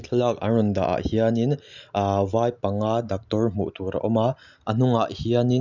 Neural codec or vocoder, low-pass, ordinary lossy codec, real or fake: none; 7.2 kHz; none; real